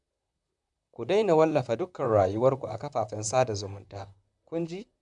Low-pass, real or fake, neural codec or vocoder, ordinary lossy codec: 10.8 kHz; fake; vocoder, 44.1 kHz, 128 mel bands, Pupu-Vocoder; none